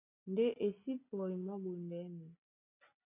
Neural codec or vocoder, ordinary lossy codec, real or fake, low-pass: none; AAC, 32 kbps; real; 3.6 kHz